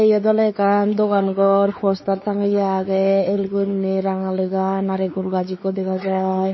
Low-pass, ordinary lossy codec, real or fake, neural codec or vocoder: 7.2 kHz; MP3, 24 kbps; fake; codec, 16 kHz, 4 kbps, X-Codec, WavLM features, trained on Multilingual LibriSpeech